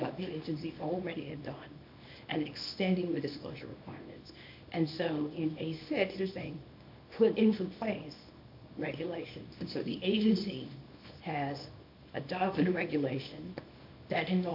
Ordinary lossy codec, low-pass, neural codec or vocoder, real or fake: AAC, 48 kbps; 5.4 kHz; codec, 24 kHz, 0.9 kbps, WavTokenizer, small release; fake